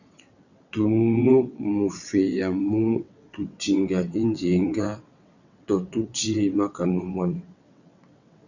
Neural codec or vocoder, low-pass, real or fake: vocoder, 22.05 kHz, 80 mel bands, WaveNeXt; 7.2 kHz; fake